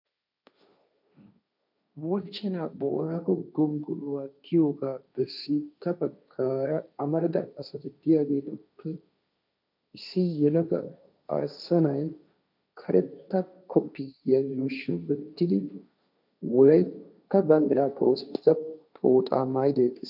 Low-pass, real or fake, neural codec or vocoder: 5.4 kHz; fake; codec, 16 kHz, 1.1 kbps, Voila-Tokenizer